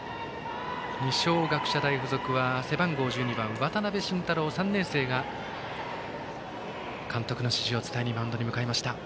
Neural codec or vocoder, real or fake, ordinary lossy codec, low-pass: none; real; none; none